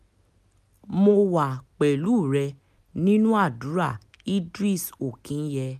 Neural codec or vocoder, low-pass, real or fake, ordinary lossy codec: none; 14.4 kHz; real; none